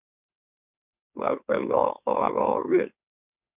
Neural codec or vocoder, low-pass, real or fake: autoencoder, 44.1 kHz, a latent of 192 numbers a frame, MeloTTS; 3.6 kHz; fake